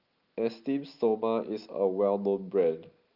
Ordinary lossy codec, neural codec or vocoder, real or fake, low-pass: Opus, 24 kbps; none; real; 5.4 kHz